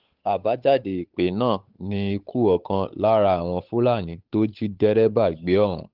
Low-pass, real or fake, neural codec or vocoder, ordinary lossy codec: 5.4 kHz; fake; codec, 16 kHz, 8 kbps, FunCodec, trained on Chinese and English, 25 frames a second; Opus, 24 kbps